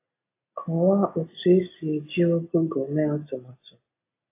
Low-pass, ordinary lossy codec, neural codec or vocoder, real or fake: 3.6 kHz; AAC, 24 kbps; none; real